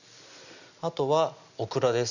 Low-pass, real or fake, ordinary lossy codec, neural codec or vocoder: 7.2 kHz; real; none; none